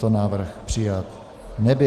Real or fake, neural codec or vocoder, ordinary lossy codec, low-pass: real; none; Opus, 24 kbps; 14.4 kHz